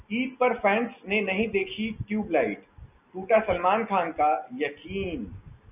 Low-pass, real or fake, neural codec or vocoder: 3.6 kHz; real; none